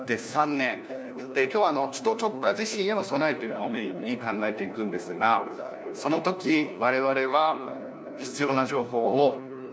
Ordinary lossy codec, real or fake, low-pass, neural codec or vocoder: none; fake; none; codec, 16 kHz, 1 kbps, FunCodec, trained on LibriTTS, 50 frames a second